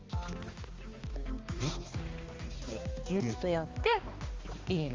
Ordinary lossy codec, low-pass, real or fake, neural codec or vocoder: Opus, 32 kbps; 7.2 kHz; fake; codec, 16 kHz, 2 kbps, X-Codec, HuBERT features, trained on balanced general audio